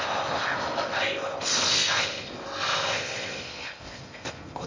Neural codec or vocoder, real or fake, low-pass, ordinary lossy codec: codec, 16 kHz in and 24 kHz out, 0.6 kbps, FocalCodec, streaming, 4096 codes; fake; 7.2 kHz; MP3, 32 kbps